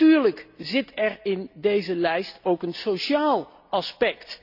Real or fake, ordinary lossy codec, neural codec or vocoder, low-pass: real; none; none; 5.4 kHz